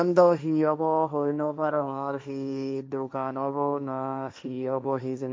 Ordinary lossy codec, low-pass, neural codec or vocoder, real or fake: none; none; codec, 16 kHz, 1.1 kbps, Voila-Tokenizer; fake